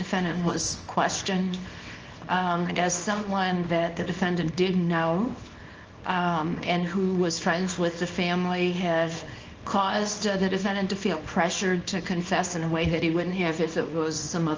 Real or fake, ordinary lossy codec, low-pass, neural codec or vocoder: fake; Opus, 24 kbps; 7.2 kHz; codec, 24 kHz, 0.9 kbps, WavTokenizer, small release